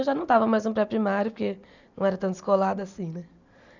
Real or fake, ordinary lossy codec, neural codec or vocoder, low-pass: real; none; none; 7.2 kHz